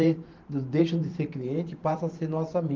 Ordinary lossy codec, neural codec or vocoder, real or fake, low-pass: Opus, 32 kbps; vocoder, 44.1 kHz, 128 mel bands every 512 samples, BigVGAN v2; fake; 7.2 kHz